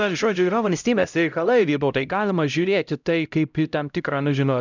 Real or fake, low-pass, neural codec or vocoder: fake; 7.2 kHz; codec, 16 kHz, 0.5 kbps, X-Codec, HuBERT features, trained on LibriSpeech